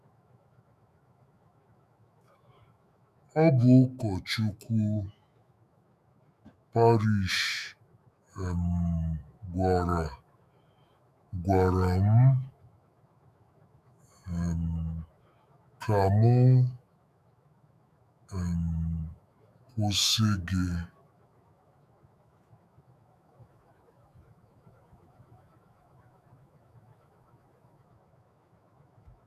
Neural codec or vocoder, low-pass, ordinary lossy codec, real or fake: autoencoder, 48 kHz, 128 numbers a frame, DAC-VAE, trained on Japanese speech; 14.4 kHz; none; fake